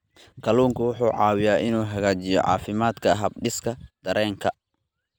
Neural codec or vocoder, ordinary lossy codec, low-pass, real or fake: none; none; none; real